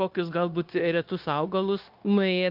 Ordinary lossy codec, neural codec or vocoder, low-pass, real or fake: Opus, 24 kbps; codec, 16 kHz, 0.9 kbps, LongCat-Audio-Codec; 5.4 kHz; fake